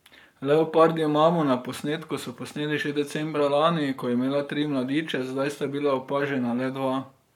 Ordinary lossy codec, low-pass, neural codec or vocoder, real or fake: none; 19.8 kHz; codec, 44.1 kHz, 7.8 kbps, Pupu-Codec; fake